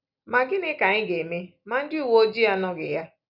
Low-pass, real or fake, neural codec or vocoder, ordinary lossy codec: 5.4 kHz; real; none; none